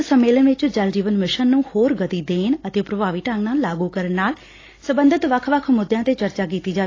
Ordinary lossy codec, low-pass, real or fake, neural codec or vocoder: AAC, 32 kbps; 7.2 kHz; real; none